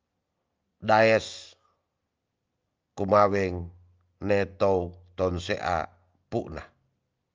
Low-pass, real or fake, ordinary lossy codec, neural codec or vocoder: 7.2 kHz; real; Opus, 24 kbps; none